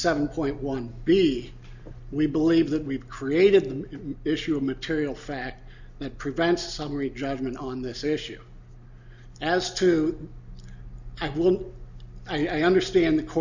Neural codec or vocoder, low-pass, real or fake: none; 7.2 kHz; real